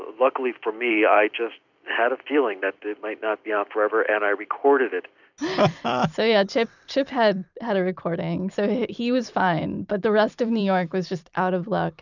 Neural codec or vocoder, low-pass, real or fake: vocoder, 44.1 kHz, 128 mel bands every 256 samples, BigVGAN v2; 7.2 kHz; fake